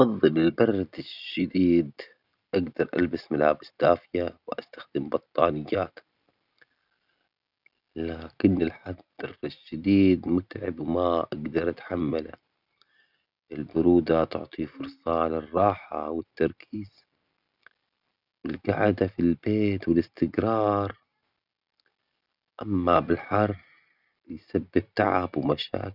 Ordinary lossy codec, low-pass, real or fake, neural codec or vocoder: none; 5.4 kHz; real; none